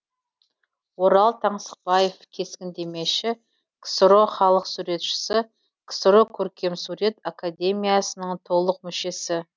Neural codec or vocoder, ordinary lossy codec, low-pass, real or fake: none; none; none; real